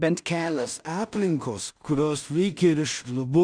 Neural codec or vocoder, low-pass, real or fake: codec, 16 kHz in and 24 kHz out, 0.4 kbps, LongCat-Audio-Codec, two codebook decoder; 9.9 kHz; fake